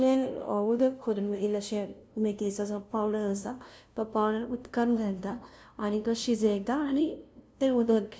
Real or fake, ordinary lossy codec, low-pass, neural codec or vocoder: fake; none; none; codec, 16 kHz, 0.5 kbps, FunCodec, trained on LibriTTS, 25 frames a second